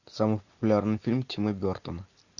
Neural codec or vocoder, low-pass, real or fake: none; 7.2 kHz; real